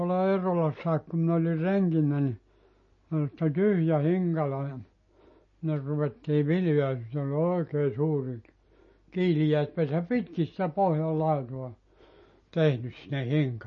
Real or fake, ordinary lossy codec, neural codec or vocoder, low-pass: real; MP3, 32 kbps; none; 7.2 kHz